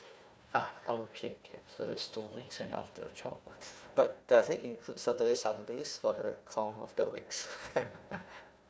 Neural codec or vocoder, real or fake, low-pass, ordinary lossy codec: codec, 16 kHz, 1 kbps, FunCodec, trained on Chinese and English, 50 frames a second; fake; none; none